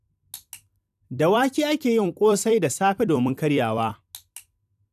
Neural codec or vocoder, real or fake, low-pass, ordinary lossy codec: vocoder, 44.1 kHz, 128 mel bands every 256 samples, BigVGAN v2; fake; 14.4 kHz; none